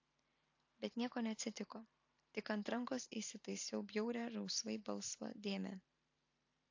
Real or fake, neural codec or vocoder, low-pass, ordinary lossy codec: fake; vocoder, 44.1 kHz, 128 mel bands every 512 samples, BigVGAN v2; 7.2 kHz; AAC, 48 kbps